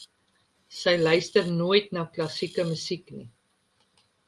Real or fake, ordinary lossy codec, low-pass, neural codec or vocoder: real; Opus, 32 kbps; 10.8 kHz; none